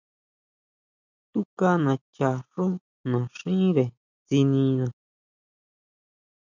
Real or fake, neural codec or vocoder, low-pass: real; none; 7.2 kHz